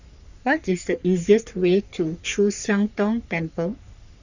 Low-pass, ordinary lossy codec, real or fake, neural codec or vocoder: 7.2 kHz; none; fake; codec, 44.1 kHz, 3.4 kbps, Pupu-Codec